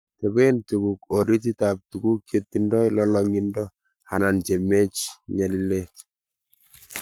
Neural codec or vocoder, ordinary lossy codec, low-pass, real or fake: codec, 44.1 kHz, 7.8 kbps, Pupu-Codec; none; none; fake